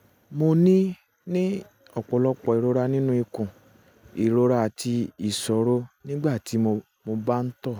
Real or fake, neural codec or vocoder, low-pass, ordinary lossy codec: real; none; none; none